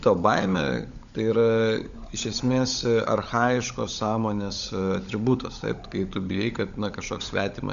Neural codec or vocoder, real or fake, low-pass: codec, 16 kHz, 16 kbps, FunCodec, trained on LibriTTS, 50 frames a second; fake; 7.2 kHz